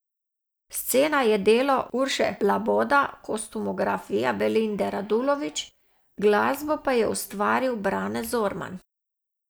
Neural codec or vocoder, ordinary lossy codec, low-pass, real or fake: none; none; none; real